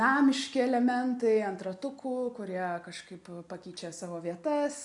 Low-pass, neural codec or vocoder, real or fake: 10.8 kHz; none; real